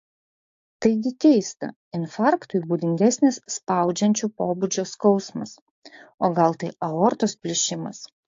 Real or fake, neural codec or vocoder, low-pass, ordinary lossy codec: fake; codec, 16 kHz, 6 kbps, DAC; 7.2 kHz; AAC, 48 kbps